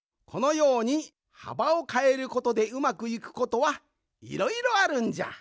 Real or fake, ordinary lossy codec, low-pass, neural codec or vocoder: real; none; none; none